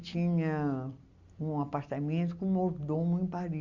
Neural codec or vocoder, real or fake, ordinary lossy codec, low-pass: none; real; Opus, 64 kbps; 7.2 kHz